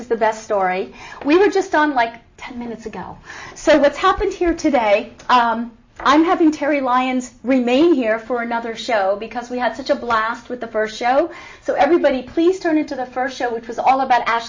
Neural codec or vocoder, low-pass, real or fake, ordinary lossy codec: none; 7.2 kHz; real; MP3, 32 kbps